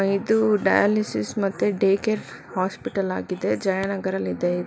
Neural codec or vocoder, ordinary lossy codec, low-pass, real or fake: none; none; none; real